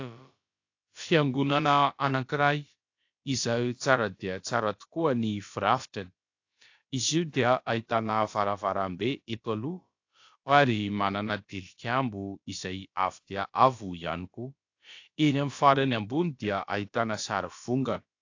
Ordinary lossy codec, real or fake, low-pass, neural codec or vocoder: AAC, 48 kbps; fake; 7.2 kHz; codec, 16 kHz, about 1 kbps, DyCAST, with the encoder's durations